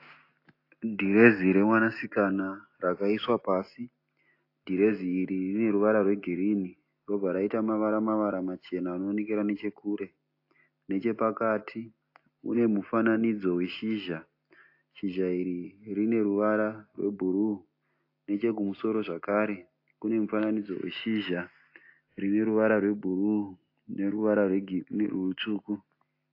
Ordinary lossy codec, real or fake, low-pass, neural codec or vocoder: AAC, 32 kbps; real; 5.4 kHz; none